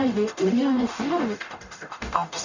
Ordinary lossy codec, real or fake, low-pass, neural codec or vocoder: none; fake; 7.2 kHz; codec, 44.1 kHz, 0.9 kbps, DAC